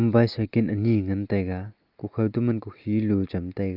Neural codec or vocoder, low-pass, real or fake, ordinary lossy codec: none; 5.4 kHz; real; Opus, 32 kbps